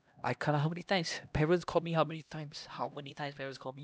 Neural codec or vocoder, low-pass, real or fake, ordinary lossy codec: codec, 16 kHz, 1 kbps, X-Codec, HuBERT features, trained on LibriSpeech; none; fake; none